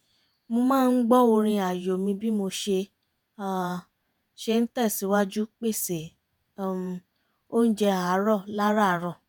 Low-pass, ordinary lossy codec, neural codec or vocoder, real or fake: none; none; vocoder, 48 kHz, 128 mel bands, Vocos; fake